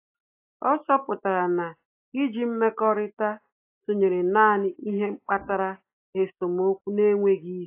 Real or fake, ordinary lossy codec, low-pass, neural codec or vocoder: real; AAC, 24 kbps; 3.6 kHz; none